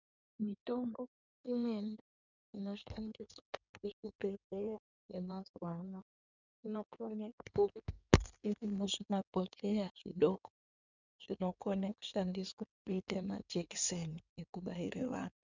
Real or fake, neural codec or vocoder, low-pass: fake; codec, 16 kHz in and 24 kHz out, 2.2 kbps, FireRedTTS-2 codec; 7.2 kHz